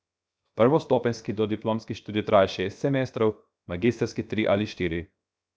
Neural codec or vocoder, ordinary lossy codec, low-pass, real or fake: codec, 16 kHz, 0.7 kbps, FocalCodec; none; none; fake